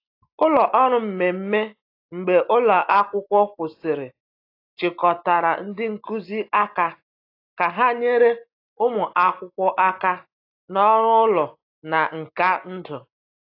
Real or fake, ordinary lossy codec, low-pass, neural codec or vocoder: real; AAC, 32 kbps; 5.4 kHz; none